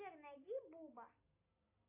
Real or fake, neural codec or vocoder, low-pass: real; none; 3.6 kHz